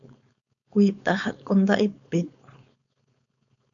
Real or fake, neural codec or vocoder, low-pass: fake; codec, 16 kHz, 4.8 kbps, FACodec; 7.2 kHz